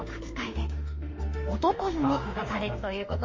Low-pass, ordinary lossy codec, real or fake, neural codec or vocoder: 7.2 kHz; MP3, 32 kbps; fake; autoencoder, 48 kHz, 32 numbers a frame, DAC-VAE, trained on Japanese speech